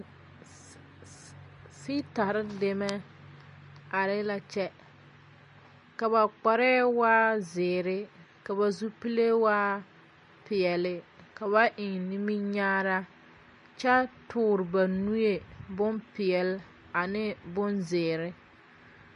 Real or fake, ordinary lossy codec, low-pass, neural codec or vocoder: real; MP3, 48 kbps; 10.8 kHz; none